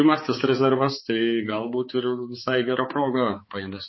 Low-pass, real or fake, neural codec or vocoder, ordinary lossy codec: 7.2 kHz; fake; codec, 16 kHz, 4 kbps, X-Codec, HuBERT features, trained on balanced general audio; MP3, 24 kbps